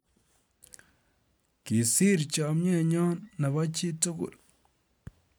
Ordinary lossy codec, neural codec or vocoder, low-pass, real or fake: none; none; none; real